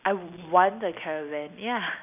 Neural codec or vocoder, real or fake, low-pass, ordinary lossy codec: none; real; 3.6 kHz; none